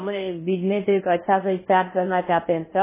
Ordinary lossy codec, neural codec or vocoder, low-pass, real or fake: MP3, 16 kbps; codec, 16 kHz in and 24 kHz out, 0.6 kbps, FocalCodec, streaming, 4096 codes; 3.6 kHz; fake